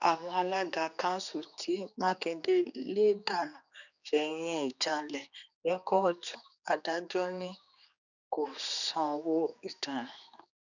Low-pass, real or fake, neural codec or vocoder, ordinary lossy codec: 7.2 kHz; fake; codec, 16 kHz, 2 kbps, X-Codec, HuBERT features, trained on general audio; none